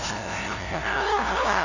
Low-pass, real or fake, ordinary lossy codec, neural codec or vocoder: 7.2 kHz; fake; none; codec, 16 kHz, 0.5 kbps, FunCodec, trained on LibriTTS, 25 frames a second